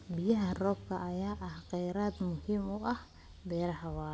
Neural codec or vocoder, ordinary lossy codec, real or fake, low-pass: none; none; real; none